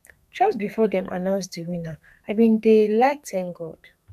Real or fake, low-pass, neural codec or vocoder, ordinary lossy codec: fake; 14.4 kHz; codec, 32 kHz, 1.9 kbps, SNAC; none